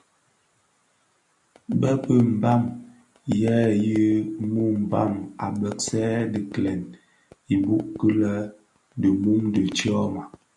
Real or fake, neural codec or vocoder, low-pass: real; none; 10.8 kHz